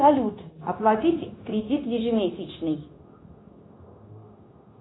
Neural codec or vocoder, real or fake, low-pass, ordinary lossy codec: codec, 16 kHz, 0.9 kbps, LongCat-Audio-Codec; fake; 7.2 kHz; AAC, 16 kbps